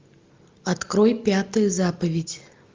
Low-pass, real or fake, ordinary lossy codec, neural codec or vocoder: 7.2 kHz; real; Opus, 32 kbps; none